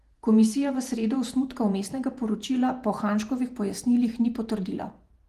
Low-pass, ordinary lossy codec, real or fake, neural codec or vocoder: 14.4 kHz; Opus, 24 kbps; fake; vocoder, 48 kHz, 128 mel bands, Vocos